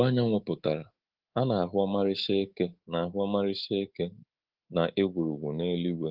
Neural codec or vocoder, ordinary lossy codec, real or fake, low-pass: codec, 24 kHz, 3.1 kbps, DualCodec; Opus, 16 kbps; fake; 5.4 kHz